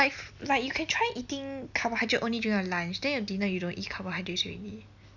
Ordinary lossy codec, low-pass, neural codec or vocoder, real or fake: none; 7.2 kHz; none; real